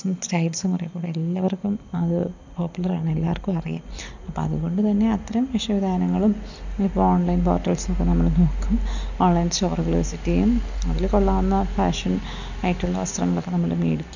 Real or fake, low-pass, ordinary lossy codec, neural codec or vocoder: real; 7.2 kHz; none; none